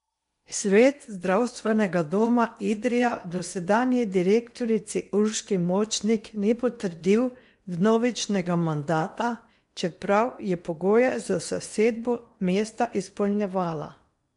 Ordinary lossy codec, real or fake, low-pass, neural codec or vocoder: MP3, 64 kbps; fake; 10.8 kHz; codec, 16 kHz in and 24 kHz out, 0.8 kbps, FocalCodec, streaming, 65536 codes